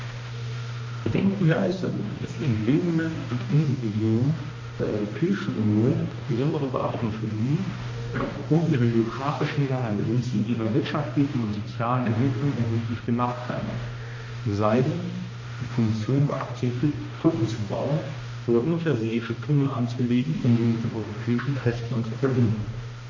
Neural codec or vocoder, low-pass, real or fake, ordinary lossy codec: codec, 16 kHz, 1 kbps, X-Codec, HuBERT features, trained on balanced general audio; 7.2 kHz; fake; MP3, 32 kbps